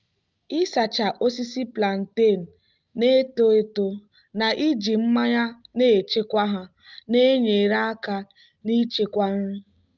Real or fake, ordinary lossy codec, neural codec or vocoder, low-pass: real; Opus, 24 kbps; none; 7.2 kHz